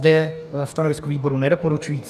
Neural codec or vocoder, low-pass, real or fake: codec, 44.1 kHz, 2.6 kbps, DAC; 14.4 kHz; fake